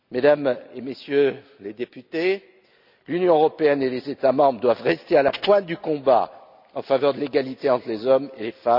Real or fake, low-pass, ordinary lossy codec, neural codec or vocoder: real; 5.4 kHz; none; none